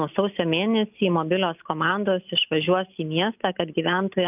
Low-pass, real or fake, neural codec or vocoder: 3.6 kHz; real; none